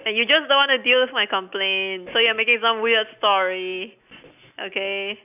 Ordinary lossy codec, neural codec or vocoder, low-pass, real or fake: none; none; 3.6 kHz; real